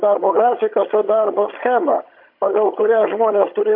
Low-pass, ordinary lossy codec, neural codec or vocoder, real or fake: 5.4 kHz; MP3, 32 kbps; vocoder, 22.05 kHz, 80 mel bands, HiFi-GAN; fake